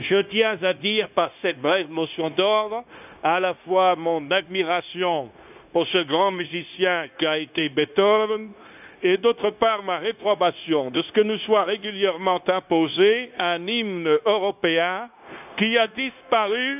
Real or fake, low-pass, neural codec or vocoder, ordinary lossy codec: fake; 3.6 kHz; codec, 16 kHz, 0.9 kbps, LongCat-Audio-Codec; none